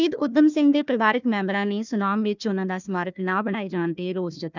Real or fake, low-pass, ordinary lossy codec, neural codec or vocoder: fake; 7.2 kHz; none; codec, 16 kHz, 1 kbps, FunCodec, trained on Chinese and English, 50 frames a second